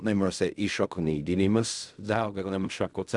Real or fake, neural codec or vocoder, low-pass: fake; codec, 16 kHz in and 24 kHz out, 0.4 kbps, LongCat-Audio-Codec, fine tuned four codebook decoder; 10.8 kHz